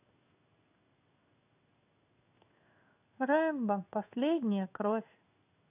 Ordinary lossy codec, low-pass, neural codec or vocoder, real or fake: AAC, 24 kbps; 3.6 kHz; codec, 16 kHz in and 24 kHz out, 1 kbps, XY-Tokenizer; fake